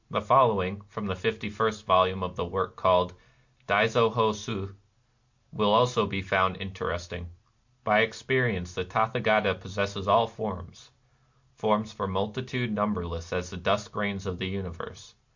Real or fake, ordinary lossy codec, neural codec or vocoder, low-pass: real; MP3, 48 kbps; none; 7.2 kHz